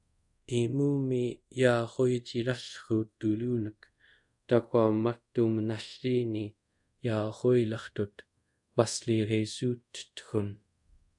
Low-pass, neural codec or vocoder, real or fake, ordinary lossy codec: 10.8 kHz; codec, 24 kHz, 0.5 kbps, DualCodec; fake; MP3, 96 kbps